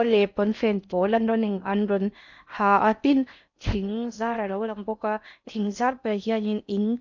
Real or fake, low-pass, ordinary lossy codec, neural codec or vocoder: fake; 7.2 kHz; none; codec, 16 kHz in and 24 kHz out, 0.6 kbps, FocalCodec, streaming, 4096 codes